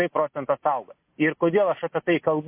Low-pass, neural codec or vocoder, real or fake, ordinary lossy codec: 3.6 kHz; none; real; MP3, 24 kbps